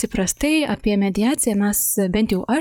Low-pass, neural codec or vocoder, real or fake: 19.8 kHz; vocoder, 44.1 kHz, 128 mel bands, Pupu-Vocoder; fake